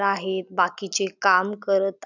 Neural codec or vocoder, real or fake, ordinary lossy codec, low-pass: none; real; none; 7.2 kHz